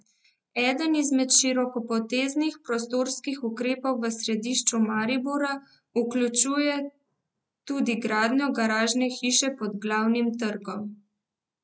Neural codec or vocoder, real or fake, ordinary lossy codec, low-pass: none; real; none; none